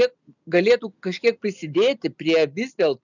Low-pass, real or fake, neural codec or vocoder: 7.2 kHz; real; none